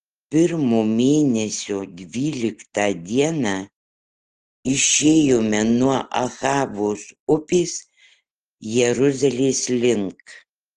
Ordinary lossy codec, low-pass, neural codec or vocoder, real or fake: Opus, 16 kbps; 10.8 kHz; none; real